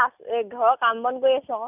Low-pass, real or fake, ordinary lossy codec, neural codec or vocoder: 3.6 kHz; real; none; none